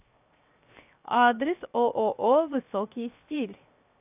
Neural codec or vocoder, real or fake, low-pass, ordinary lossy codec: codec, 16 kHz, 0.7 kbps, FocalCodec; fake; 3.6 kHz; AAC, 32 kbps